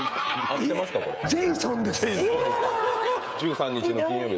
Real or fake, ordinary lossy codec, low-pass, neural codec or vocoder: fake; none; none; codec, 16 kHz, 16 kbps, FreqCodec, smaller model